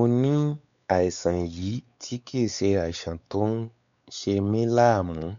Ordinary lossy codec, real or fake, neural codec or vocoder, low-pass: none; fake; codec, 16 kHz, 4 kbps, X-Codec, WavLM features, trained on Multilingual LibriSpeech; 7.2 kHz